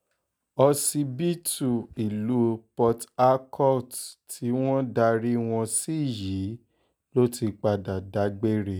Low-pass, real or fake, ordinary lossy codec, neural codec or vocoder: none; real; none; none